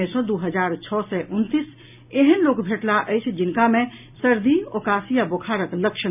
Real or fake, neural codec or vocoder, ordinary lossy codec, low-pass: real; none; none; 3.6 kHz